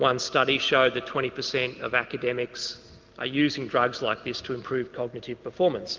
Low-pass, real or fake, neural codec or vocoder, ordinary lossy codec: 7.2 kHz; real; none; Opus, 32 kbps